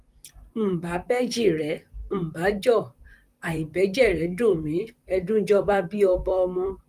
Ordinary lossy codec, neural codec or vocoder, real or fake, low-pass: Opus, 32 kbps; vocoder, 44.1 kHz, 128 mel bands, Pupu-Vocoder; fake; 14.4 kHz